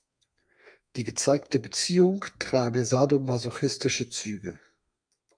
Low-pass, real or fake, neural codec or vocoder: 9.9 kHz; fake; codec, 32 kHz, 1.9 kbps, SNAC